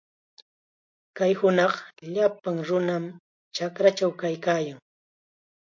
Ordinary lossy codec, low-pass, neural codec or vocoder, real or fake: MP3, 48 kbps; 7.2 kHz; none; real